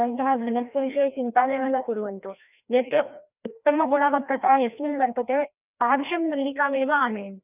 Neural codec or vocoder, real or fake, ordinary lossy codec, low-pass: codec, 16 kHz, 1 kbps, FreqCodec, larger model; fake; none; 3.6 kHz